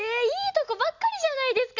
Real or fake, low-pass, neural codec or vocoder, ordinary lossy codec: real; 7.2 kHz; none; none